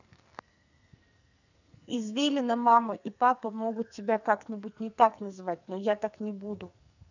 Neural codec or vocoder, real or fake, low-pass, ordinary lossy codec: codec, 44.1 kHz, 2.6 kbps, SNAC; fake; 7.2 kHz; none